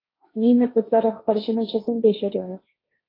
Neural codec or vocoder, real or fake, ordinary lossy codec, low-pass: codec, 16 kHz, 1.1 kbps, Voila-Tokenizer; fake; AAC, 24 kbps; 5.4 kHz